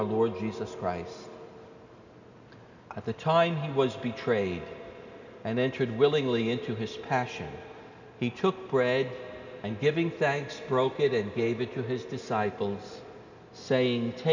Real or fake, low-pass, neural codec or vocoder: real; 7.2 kHz; none